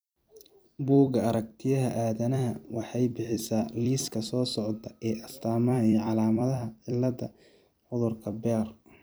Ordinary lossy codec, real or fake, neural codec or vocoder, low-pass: none; fake; vocoder, 44.1 kHz, 128 mel bands every 256 samples, BigVGAN v2; none